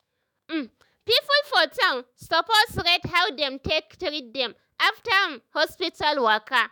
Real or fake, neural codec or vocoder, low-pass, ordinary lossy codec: fake; autoencoder, 48 kHz, 128 numbers a frame, DAC-VAE, trained on Japanese speech; none; none